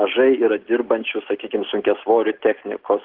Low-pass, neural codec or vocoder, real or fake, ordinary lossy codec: 5.4 kHz; none; real; Opus, 16 kbps